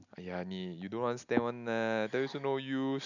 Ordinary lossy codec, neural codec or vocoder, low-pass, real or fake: none; none; 7.2 kHz; real